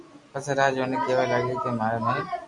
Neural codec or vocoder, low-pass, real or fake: none; 10.8 kHz; real